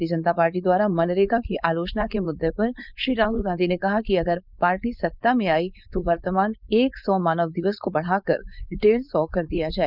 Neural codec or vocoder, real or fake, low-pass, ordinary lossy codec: codec, 16 kHz, 4.8 kbps, FACodec; fake; 5.4 kHz; none